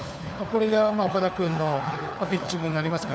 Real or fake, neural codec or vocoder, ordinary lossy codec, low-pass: fake; codec, 16 kHz, 4 kbps, FunCodec, trained on LibriTTS, 50 frames a second; none; none